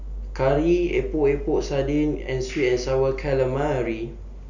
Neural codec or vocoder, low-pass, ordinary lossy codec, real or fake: none; 7.2 kHz; none; real